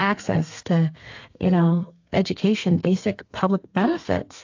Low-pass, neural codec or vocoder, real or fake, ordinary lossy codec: 7.2 kHz; codec, 32 kHz, 1.9 kbps, SNAC; fake; AAC, 48 kbps